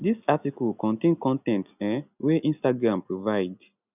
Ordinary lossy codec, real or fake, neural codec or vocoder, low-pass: none; real; none; 3.6 kHz